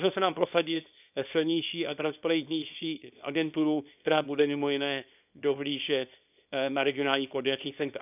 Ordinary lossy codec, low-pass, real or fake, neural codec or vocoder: none; 3.6 kHz; fake; codec, 24 kHz, 0.9 kbps, WavTokenizer, small release